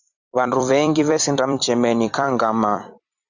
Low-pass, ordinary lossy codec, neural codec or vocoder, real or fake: 7.2 kHz; Opus, 64 kbps; vocoder, 44.1 kHz, 128 mel bands every 256 samples, BigVGAN v2; fake